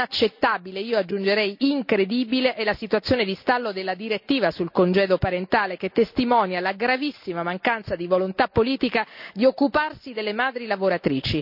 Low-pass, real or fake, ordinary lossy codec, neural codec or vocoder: 5.4 kHz; real; none; none